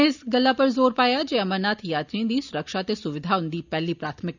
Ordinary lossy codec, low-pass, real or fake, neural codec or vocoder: none; 7.2 kHz; real; none